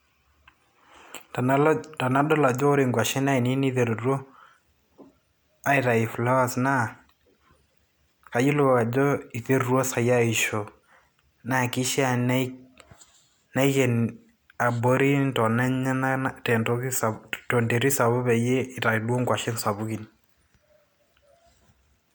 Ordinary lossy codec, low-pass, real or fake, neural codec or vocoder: none; none; real; none